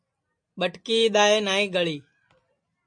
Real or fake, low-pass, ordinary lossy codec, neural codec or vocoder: real; 9.9 kHz; MP3, 64 kbps; none